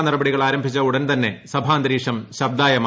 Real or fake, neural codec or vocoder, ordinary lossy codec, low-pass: real; none; none; none